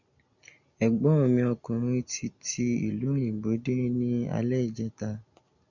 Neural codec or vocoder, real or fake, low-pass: none; real; 7.2 kHz